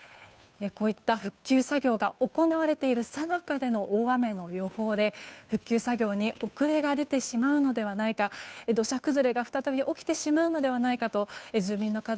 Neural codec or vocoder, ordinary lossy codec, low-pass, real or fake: codec, 16 kHz, 2 kbps, FunCodec, trained on Chinese and English, 25 frames a second; none; none; fake